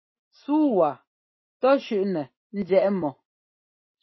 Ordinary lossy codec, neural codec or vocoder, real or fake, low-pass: MP3, 24 kbps; none; real; 7.2 kHz